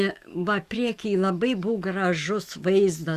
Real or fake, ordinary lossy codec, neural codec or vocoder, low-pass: fake; Opus, 64 kbps; autoencoder, 48 kHz, 128 numbers a frame, DAC-VAE, trained on Japanese speech; 14.4 kHz